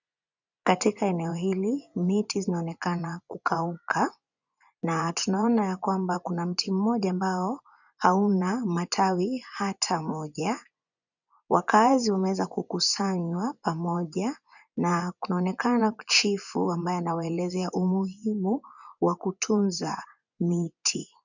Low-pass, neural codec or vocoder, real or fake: 7.2 kHz; vocoder, 24 kHz, 100 mel bands, Vocos; fake